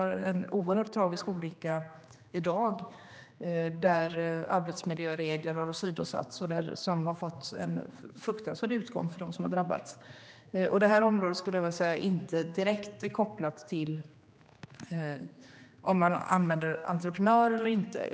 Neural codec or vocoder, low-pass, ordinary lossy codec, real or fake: codec, 16 kHz, 2 kbps, X-Codec, HuBERT features, trained on general audio; none; none; fake